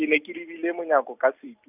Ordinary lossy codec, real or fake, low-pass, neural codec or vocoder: none; real; 3.6 kHz; none